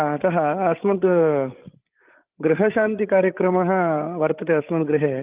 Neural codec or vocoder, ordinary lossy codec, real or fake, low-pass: codec, 16 kHz, 8 kbps, FreqCodec, larger model; Opus, 32 kbps; fake; 3.6 kHz